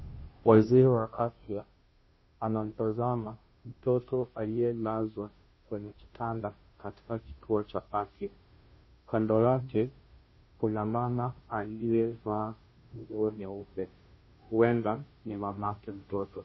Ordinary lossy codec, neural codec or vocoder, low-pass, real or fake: MP3, 24 kbps; codec, 16 kHz, 0.5 kbps, FunCodec, trained on Chinese and English, 25 frames a second; 7.2 kHz; fake